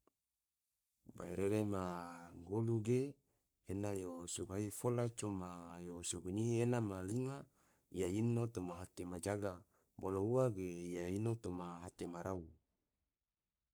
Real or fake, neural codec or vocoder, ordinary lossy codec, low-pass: fake; codec, 44.1 kHz, 3.4 kbps, Pupu-Codec; none; none